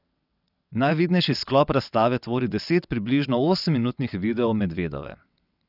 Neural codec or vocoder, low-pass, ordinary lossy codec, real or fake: vocoder, 22.05 kHz, 80 mel bands, WaveNeXt; 5.4 kHz; none; fake